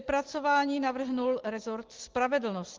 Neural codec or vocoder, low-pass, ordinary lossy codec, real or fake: none; 7.2 kHz; Opus, 16 kbps; real